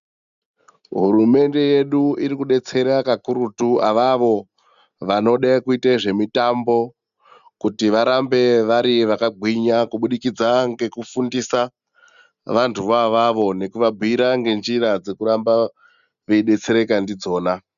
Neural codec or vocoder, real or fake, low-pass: none; real; 7.2 kHz